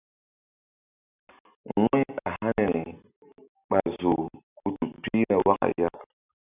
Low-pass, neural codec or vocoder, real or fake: 3.6 kHz; none; real